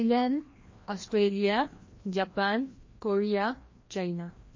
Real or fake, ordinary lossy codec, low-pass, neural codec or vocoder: fake; MP3, 32 kbps; 7.2 kHz; codec, 16 kHz, 1 kbps, FreqCodec, larger model